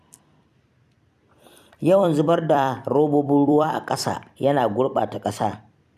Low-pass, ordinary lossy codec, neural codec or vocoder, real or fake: 14.4 kHz; none; vocoder, 44.1 kHz, 128 mel bands every 256 samples, BigVGAN v2; fake